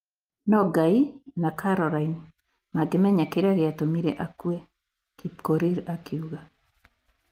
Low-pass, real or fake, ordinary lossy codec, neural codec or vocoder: 14.4 kHz; real; Opus, 32 kbps; none